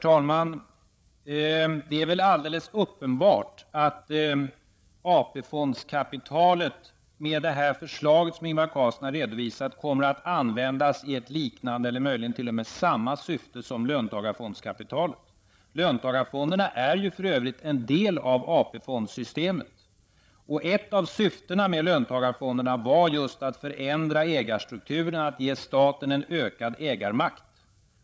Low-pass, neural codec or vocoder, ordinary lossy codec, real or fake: none; codec, 16 kHz, 8 kbps, FreqCodec, larger model; none; fake